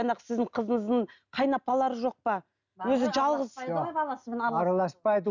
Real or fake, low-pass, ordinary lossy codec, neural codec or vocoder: real; 7.2 kHz; none; none